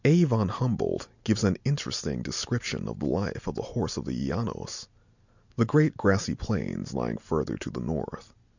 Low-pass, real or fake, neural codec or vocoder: 7.2 kHz; real; none